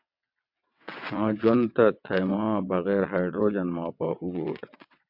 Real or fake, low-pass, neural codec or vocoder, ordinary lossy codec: fake; 5.4 kHz; vocoder, 22.05 kHz, 80 mel bands, WaveNeXt; MP3, 48 kbps